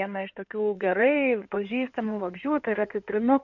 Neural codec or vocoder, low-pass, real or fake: codec, 16 kHz in and 24 kHz out, 2.2 kbps, FireRedTTS-2 codec; 7.2 kHz; fake